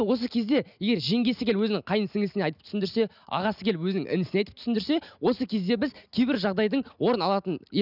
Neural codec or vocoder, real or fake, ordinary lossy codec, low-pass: none; real; none; 5.4 kHz